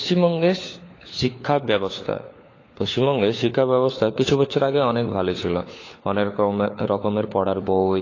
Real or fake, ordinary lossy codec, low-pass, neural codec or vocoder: fake; AAC, 32 kbps; 7.2 kHz; codec, 16 kHz, 4 kbps, FunCodec, trained on LibriTTS, 50 frames a second